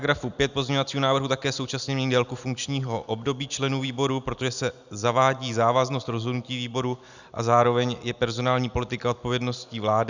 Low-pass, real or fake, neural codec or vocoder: 7.2 kHz; real; none